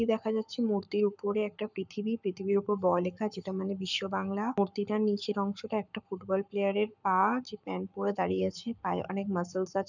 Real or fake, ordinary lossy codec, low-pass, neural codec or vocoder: fake; none; 7.2 kHz; codec, 44.1 kHz, 7.8 kbps, Pupu-Codec